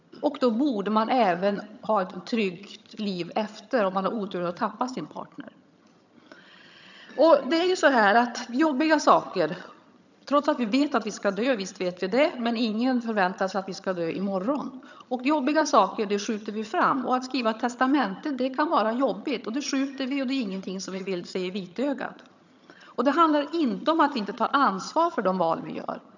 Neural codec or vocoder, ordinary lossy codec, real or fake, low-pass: vocoder, 22.05 kHz, 80 mel bands, HiFi-GAN; none; fake; 7.2 kHz